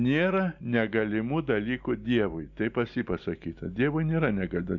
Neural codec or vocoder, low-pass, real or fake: none; 7.2 kHz; real